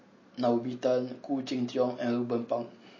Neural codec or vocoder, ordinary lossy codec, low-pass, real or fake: none; MP3, 32 kbps; 7.2 kHz; real